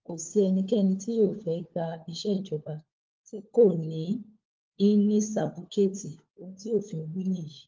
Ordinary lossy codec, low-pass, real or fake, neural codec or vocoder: Opus, 32 kbps; 7.2 kHz; fake; codec, 16 kHz, 4 kbps, FunCodec, trained on LibriTTS, 50 frames a second